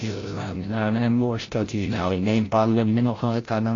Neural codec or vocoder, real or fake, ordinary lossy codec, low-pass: codec, 16 kHz, 0.5 kbps, FreqCodec, larger model; fake; AAC, 32 kbps; 7.2 kHz